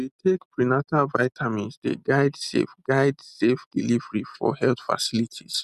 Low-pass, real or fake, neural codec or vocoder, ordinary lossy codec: 14.4 kHz; real; none; none